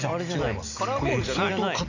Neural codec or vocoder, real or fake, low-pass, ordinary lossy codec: none; real; 7.2 kHz; none